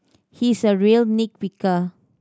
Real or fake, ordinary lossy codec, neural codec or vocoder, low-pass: real; none; none; none